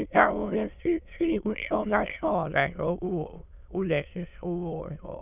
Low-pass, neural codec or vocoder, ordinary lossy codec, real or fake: 3.6 kHz; autoencoder, 22.05 kHz, a latent of 192 numbers a frame, VITS, trained on many speakers; none; fake